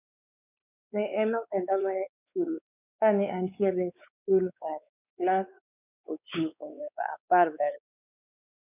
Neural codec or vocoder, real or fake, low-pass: codec, 16 kHz, 4 kbps, X-Codec, WavLM features, trained on Multilingual LibriSpeech; fake; 3.6 kHz